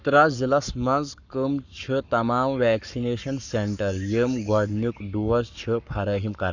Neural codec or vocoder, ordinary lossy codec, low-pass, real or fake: codec, 44.1 kHz, 7.8 kbps, Pupu-Codec; none; 7.2 kHz; fake